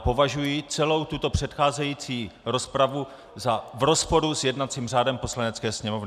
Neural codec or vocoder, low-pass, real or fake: none; 14.4 kHz; real